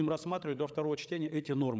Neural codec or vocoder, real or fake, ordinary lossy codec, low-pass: codec, 16 kHz, 8 kbps, FreqCodec, larger model; fake; none; none